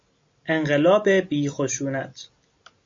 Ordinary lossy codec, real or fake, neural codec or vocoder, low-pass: MP3, 48 kbps; real; none; 7.2 kHz